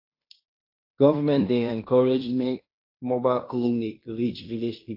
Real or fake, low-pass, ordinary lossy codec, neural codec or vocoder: fake; 5.4 kHz; AAC, 32 kbps; codec, 16 kHz in and 24 kHz out, 0.9 kbps, LongCat-Audio-Codec, fine tuned four codebook decoder